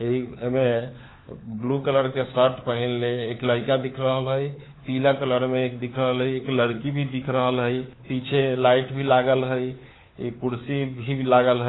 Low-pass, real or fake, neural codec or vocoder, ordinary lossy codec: 7.2 kHz; fake; codec, 16 kHz, 4 kbps, FunCodec, trained on LibriTTS, 50 frames a second; AAC, 16 kbps